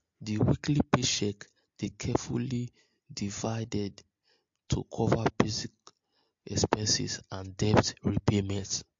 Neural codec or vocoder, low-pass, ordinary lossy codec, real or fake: none; 7.2 kHz; MP3, 48 kbps; real